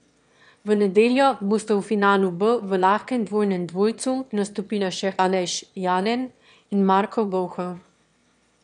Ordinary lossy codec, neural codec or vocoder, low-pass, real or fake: none; autoencoder, 22.05 kHz, a latent of 192 numbers a frame, VITS, trained on one speaker; 9.9 kHz; fake